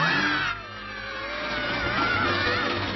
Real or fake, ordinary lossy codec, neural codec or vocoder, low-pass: real; MP3, 24 kbps; none; 7.2 kHz